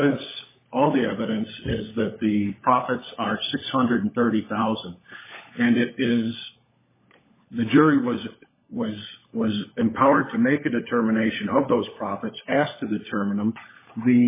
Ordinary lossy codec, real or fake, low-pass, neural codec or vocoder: MP3, 16 kbps; fake; 3.6 kHz; codec, 16 kHz, 16 kbps, FunCodec, trained on LibriTTS, 50 frames a second